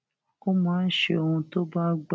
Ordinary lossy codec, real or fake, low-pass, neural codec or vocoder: none; real; none; none